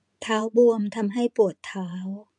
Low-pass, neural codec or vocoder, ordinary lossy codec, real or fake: 10.8 kHz; vocoder, 24 kHz, 100 mel bands, Vocos; none; fake